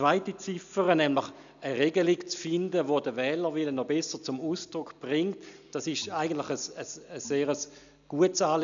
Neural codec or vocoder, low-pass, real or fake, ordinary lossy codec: none; 7.2 kHz; real; none